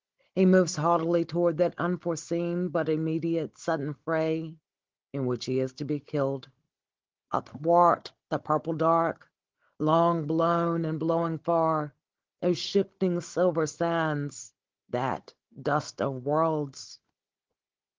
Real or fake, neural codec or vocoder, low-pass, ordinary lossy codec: fake; codec, 16 kHz, 16 kbps, FunCodec, trained on Chinese and English, 50 frames a second; 7.2 kHz; Opus, 16 kbps